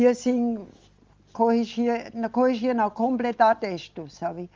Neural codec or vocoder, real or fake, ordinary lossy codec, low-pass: none; real; Opus, 32 kbps; 7.2 kHz